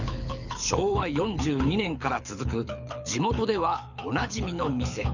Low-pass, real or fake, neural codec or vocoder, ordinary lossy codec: 7.2 kHz; fake; codec, 24 kHz, 6 kbps, HILCodec; none